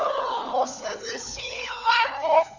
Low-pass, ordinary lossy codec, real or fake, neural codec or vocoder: 7.2 kHz; none; fake; codec, 16 kHz, 4 kbps, FunCodec, trained on LibriTTS, 50 frames a second